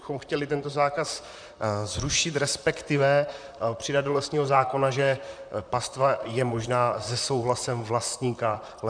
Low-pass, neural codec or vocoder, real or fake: 9.9 kHz; vocoder, 44.1 kHz, 128 mel bands, Pupu-Vocoder; fake